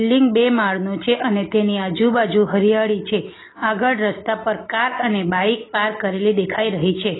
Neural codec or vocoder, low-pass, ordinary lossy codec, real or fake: none; 7.2 kHz; AAC, 16 kbps; real